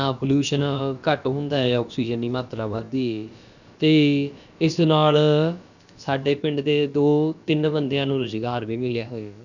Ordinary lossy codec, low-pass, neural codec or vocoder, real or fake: none; 7.2 kHz; codec, 16 kHz, about 1 kbps, DyCAST, with the encoder's durations; fake